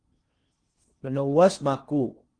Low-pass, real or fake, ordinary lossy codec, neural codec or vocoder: 9.9 kHz; fake; Opus, 24 kbps; codec, 16 kHz in and 24 kHz out, 0.8 kbps, FocalCodec, streaming, 65536 codes